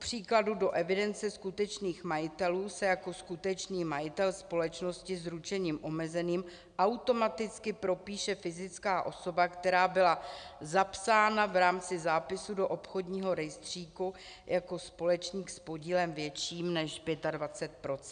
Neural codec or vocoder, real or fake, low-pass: none; real; 9.9 kHz